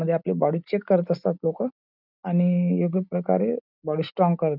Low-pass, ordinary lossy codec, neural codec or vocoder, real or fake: 5.4 kHz; none; none; real